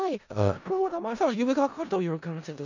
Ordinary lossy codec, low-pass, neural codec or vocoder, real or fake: none; 7.2 kHz; codec, 16 kHz in and 24 kHz out, 0.4 kbps, LongCat-Audio-Codec, four codebook decoder; fake